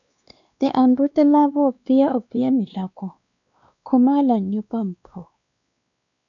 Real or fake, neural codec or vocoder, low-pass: fake; codec, 16 kHz, 2 kbps, X-Codec, WavLM features, trained on Multilingual LibriSpeech; 7.2 kHz